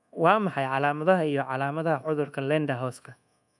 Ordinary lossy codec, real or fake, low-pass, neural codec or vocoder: none; fake; none; codec, 24 kHz, 1.2 kbps, DualCodec